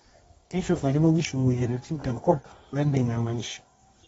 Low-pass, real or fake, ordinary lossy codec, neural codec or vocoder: 10.8 kHz; fake; AAC, 24 kbps; codec, 24 kHz, 0.9 kbps, WavTokenizer, medium music audio release